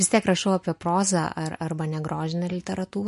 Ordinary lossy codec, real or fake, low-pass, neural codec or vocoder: MP3, 48 kbps; real; 14.4 kHz; none